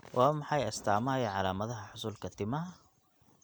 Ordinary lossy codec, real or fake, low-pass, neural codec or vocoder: none; real; none; none